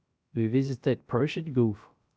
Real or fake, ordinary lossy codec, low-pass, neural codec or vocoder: fake; none; none; codec, 16 kHz, 0.3 kbps, FocalCodec